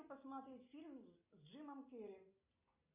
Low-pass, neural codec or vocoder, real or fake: 3.6 kHz; none; real